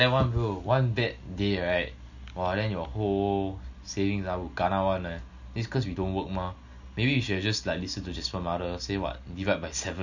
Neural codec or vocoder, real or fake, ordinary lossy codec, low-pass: none; real; none; 7.2 kHz